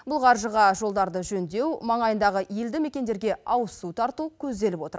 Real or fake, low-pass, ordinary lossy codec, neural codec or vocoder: real; none; none; none